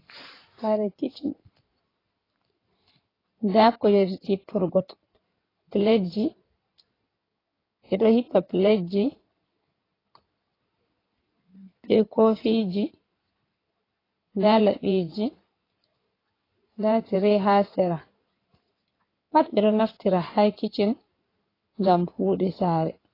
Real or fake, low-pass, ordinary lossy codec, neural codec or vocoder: fake; 5.4 kHz; AAC, 24 kbps; codec, 16 kHz in and 24 kHz out, 2.2 kbps, FireRedTTS-2 codec